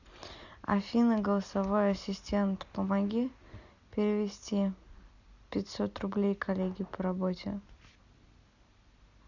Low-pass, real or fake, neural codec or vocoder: 7.2 kHz; real; none